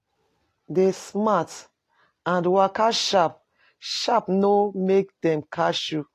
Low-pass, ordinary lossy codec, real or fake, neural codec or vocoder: 14.4 kHz; AAC, 48 kbps; real; none